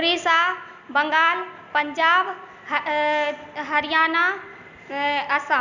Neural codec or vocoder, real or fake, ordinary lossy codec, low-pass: none; real; none; 7.2 kHz